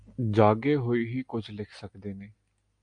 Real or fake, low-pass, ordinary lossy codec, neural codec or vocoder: real; 9.9 kHz; AAC, 64 kbps; none